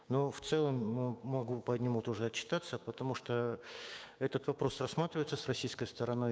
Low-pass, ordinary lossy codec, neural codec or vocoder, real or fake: none; none; codec, 16 kHz, 6 kbps, DAC; fake